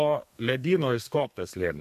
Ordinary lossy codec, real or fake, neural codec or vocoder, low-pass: MP3, 64 kbps; fake; codec, 32 kHz, 1.9 kbps, SNAC; 14.4 kHz